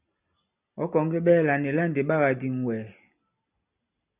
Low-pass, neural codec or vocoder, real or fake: 3.6 kHz; none; real